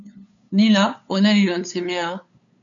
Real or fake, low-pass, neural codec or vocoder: fake; 7.2 kHz; codec, 16 kHz, 8 kbps, FunCodec, trained on LibriTTS, 25 frames a second